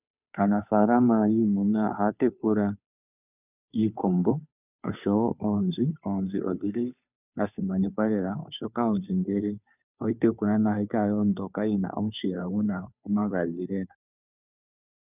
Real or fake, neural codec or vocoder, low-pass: fake; codec, 16 kHz, 2 kbps, FunCodec, trained on Chinese and English, 25 frames a second; 3.6 kHz